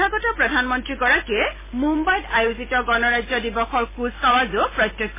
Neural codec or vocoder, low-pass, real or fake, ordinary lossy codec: none; 3.6 kHz; real; MP3, 16 kbps